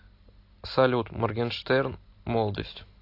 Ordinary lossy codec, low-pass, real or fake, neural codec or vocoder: AAC, 32 kbps; 5.4 kHz; real; none